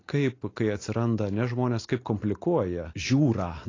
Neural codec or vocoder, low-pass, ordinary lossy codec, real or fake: none; 7.2 kHz; AAC, 32 kbps; real